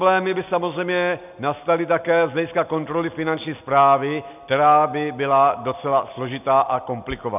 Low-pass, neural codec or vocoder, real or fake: 3.6 kHz; none; real